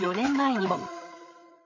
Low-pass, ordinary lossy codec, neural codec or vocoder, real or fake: 7.2 kHz; MP3, 32 kbps; codec, 16 kHz, 8 kbps, FreqCodec, larger model; fake